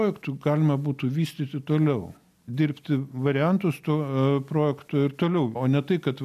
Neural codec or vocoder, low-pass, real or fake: none; 14.4 kHz; real